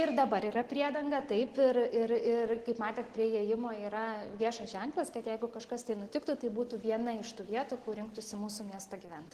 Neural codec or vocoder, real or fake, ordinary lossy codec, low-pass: none; real; Opus, 16 kbps; 14.4 kHz